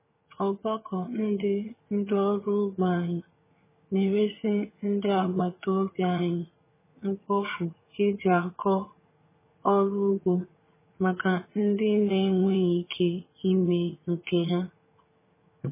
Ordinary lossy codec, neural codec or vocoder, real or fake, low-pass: MP3, 16 kbps; vocoder, 22.05 kHz, 80 mel bands, HiFi-GAN; fake; 3.6 kHz